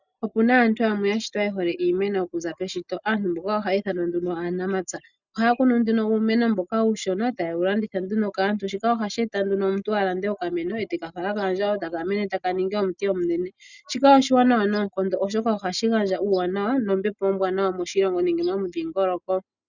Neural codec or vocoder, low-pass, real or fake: none; 7.2 kHz; real